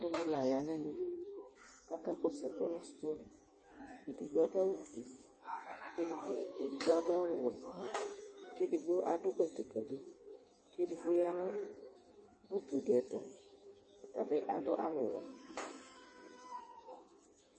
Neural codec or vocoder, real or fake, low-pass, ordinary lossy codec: codec, 16 kHz in and 24 kHz out, 1.1 kbps, FireRedTTS-2 codec; fake; 9.9 kHz; MP3, 32 kbps